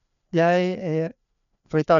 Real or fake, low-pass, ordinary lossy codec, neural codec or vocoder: fake; 7.2 kHz; none; codec, 16 kHz, 2 kbps, FreqCodec, larger model